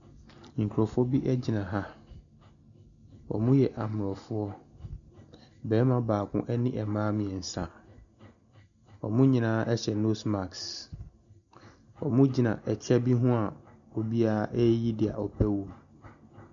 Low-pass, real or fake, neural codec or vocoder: 7.2 kHz; real; none